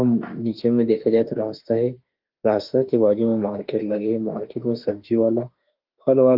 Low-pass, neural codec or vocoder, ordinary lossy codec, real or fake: 5.4 kHz; autoencoder, 48 kHz, 32 numbers a frame, DAC-VAE, trained on Japanese speech; Opus, 32 kbps; fake